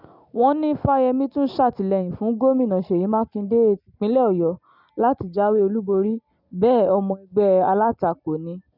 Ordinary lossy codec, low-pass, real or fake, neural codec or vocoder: none; 5.4 kHz; real; none